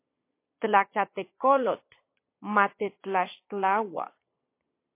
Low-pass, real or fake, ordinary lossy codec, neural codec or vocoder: 3.6 kHz; fake; MP3, 24 kbps; vocoder, 22.05 kHz, 80 mel bands, Vocos